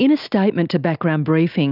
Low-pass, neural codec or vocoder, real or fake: 5.4 kHz; none; real